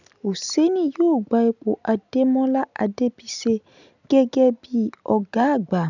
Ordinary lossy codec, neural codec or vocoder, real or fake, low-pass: none; none; real; 7.2 kHz